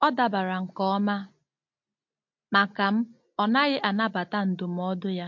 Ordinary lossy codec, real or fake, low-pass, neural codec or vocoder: MP3, 48 kbps; real; 7.2 kHz; none